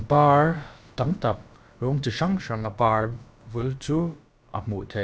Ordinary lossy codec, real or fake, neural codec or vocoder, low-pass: none; fake; codec, 16 kHz, about 1 kbps, DyCAST, with the encoder's durations; none